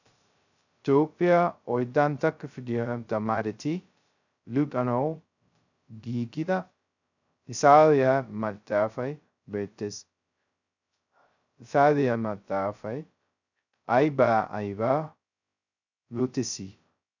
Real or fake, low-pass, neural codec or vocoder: fake; 7.2 kHz; codec, 16 kHz, 0.2 kbps, FocalCodec